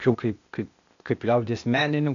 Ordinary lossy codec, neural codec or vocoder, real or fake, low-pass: MP3, 96 kbps; codec, 16 kHz, 0.8 kbps, ZipCodec; fake; 7.2 kHz